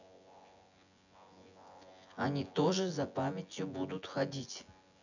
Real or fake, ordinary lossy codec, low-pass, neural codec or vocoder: fake; none; 7.2 kHz; vocoder, 24 kHz, 100 mel bands, Vocos